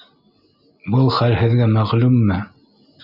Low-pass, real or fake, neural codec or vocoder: 5.4 kHz; real; none